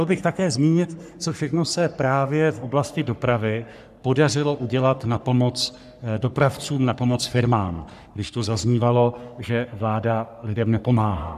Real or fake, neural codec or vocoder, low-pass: fake; codec, 44.1 kHz, 3.4 kbps, Pupu-Codec; 14.4 kHz